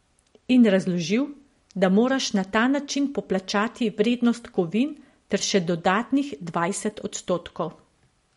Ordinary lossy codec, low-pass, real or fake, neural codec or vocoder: MP3, 48 kbps; 19.8 kHz; real; none